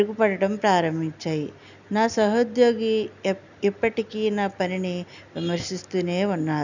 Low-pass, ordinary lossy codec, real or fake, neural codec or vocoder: 7.2 kHz; none; real; none